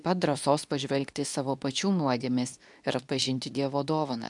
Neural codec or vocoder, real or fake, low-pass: codec, 24 kHz, 0.9 kbps, WavTokenizer, medium speech release version 2; fake; 10.8 kHz